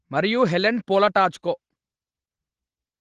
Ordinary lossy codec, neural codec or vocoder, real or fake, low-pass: Opus, 16 kbps; none; real; 9.9 kHz